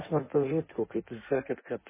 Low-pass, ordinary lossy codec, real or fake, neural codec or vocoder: 3.6 kHz; MP3, 16 kbps; fake; codec, 16 kHz in and 24 kHz out, 1.1 kbps, FireRedTTS-2 codec